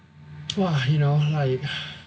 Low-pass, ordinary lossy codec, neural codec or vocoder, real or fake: none; none; none; real